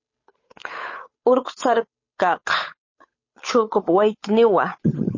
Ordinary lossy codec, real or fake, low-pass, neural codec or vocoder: MP3, 32 kbps; fake; 7.2 kHz; codec, 16 kHz, 8 kbps, FunCodec, trained on Chinese and English, 25 frames a second